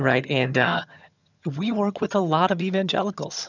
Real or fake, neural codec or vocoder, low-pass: fake; vocoder, 22.05 kHz, 80 mel bands, HiFi-GAN; 7.2 kHz